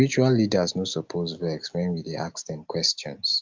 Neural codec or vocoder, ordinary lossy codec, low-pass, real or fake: none; Opus, 24 kbps; 7.2 kHz; real